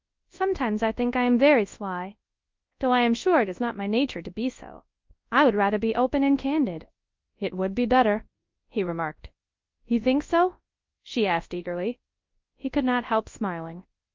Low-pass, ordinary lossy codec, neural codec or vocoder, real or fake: 7.2 kHz; Opus, 24 kbps; codec, 24 kHz, 0.9 kbps, WavTokenizer, large speech release; fake